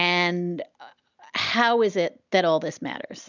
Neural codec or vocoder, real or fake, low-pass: none; real; 7.2 kHz